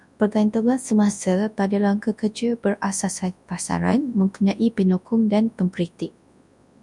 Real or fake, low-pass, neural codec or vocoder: fake; 10.8 kHz; codec, 24 kHz, 0.9 kbps, WavTokenizer, large speech release